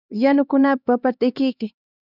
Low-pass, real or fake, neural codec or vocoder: 5.4 kHz; fake; codec, 16 kHz, 2 kbps, X-Codec, HuBERT features, trained on LibriSpeech